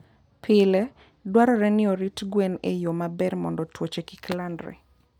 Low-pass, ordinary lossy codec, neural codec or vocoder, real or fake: 19.8 kHz; none; none; real